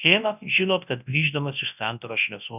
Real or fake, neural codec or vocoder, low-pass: fake; codec, 24 kHz, 0.9 kbps, WavTokenizer, large speech release; 3.6 kHz